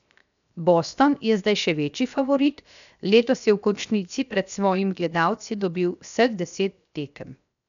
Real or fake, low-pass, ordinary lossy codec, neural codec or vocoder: fake; 7.2 kHz; none; codec, 16 kHz, 0.7 kbps, FocalCodec